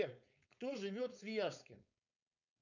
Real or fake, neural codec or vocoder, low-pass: fake; codec, 16 kHz, 4.8 kbps, FACodec; 7.2 kHz